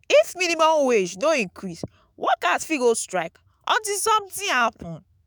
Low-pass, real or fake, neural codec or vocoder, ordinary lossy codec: none; fake; autoencoder, 48 kHz, 128 numbers a frame, DAC-VAE, trained on Japanese speech; none